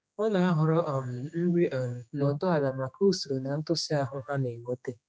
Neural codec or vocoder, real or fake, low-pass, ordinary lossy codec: codec, 16 kHz, 2 kbps, X-Codec, HuBERT features, trained on general audio; fake; none; none